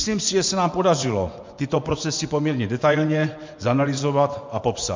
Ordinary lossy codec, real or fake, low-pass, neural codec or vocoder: MP3, 64 kbps; fake; 7.2 kHz; vocoder, 24 kHz, 100 mel bands, Vocos